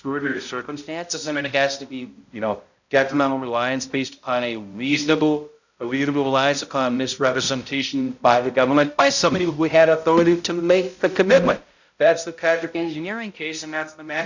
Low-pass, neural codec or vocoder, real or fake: 7.2 kHz; codec, 16 kHz, 0.5 kbps, X-Codec, HuBERT features, trained on balanced general audio; fake